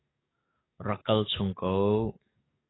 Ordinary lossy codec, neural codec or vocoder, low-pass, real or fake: AAC, 16 kbps; codec, 44.1 kHz, 7.8 kbps, DAC; 7.2 kHz; fake